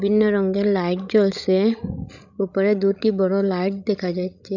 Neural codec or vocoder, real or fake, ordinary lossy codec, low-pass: codec, 16 kHz, 16 kbps, FreqCodec, larger model; fake; none; 7.2 kHz